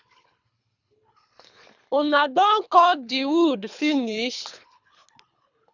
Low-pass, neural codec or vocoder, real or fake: 7.2 kHz; codec, 24 kHz, 3 kbps, HILCodec; fake